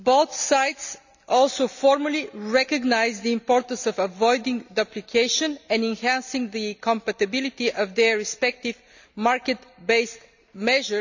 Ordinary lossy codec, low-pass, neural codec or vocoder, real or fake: none; 7.2 kHz; none; real